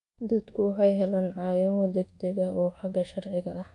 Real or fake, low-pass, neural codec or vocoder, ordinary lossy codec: fake; 10.8 kHz; codec, 24 kHz, 1.2 kbps, DualCodec; AAC, 48 kbps